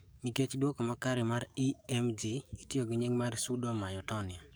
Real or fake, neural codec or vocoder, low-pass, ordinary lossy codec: fake; codec, 44.1 kHz, 7.8 kbps, DAC; none; none